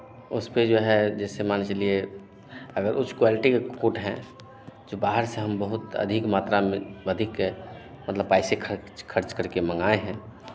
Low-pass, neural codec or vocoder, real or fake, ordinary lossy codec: none; none; real; none